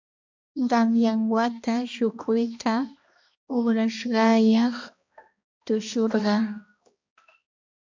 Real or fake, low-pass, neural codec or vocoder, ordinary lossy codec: fake; 7.2 kHz; codec, 16 kHz, 1 kbps, X-Codec, HuBERT features, trained on balanced general audio; MP3, 64 kbps